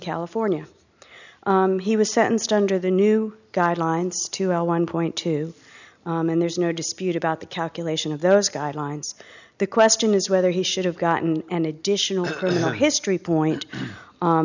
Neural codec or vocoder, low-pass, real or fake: none; 7.2 kHz; real